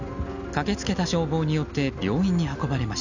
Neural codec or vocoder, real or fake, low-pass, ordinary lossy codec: none; real; 7.2 kHz; none